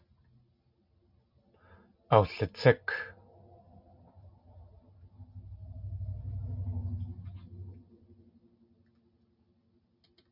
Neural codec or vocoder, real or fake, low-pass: none; real; 5.4 kHz